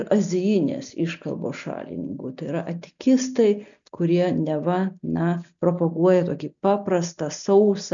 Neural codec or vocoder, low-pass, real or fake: none; 7.2 kHz; real